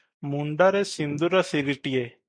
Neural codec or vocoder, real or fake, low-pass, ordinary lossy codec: none; real; 9.9 kHz; MP3, 96 kbps